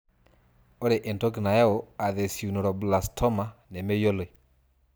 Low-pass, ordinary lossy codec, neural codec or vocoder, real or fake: none; none; none; real